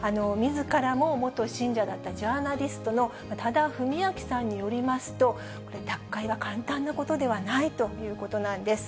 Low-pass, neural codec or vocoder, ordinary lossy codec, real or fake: none; none; none; real